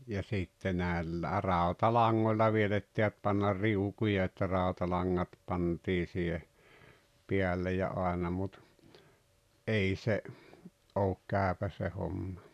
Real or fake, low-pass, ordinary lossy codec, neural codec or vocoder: real; 14.4 kHz; none; none